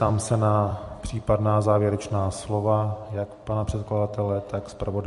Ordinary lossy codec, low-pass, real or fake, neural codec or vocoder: MP3, 48 kbps; 14.4 kHz; fake; vocoder, 44.1 kHz, 128 mel bands every 256 samples, BigVGAN v2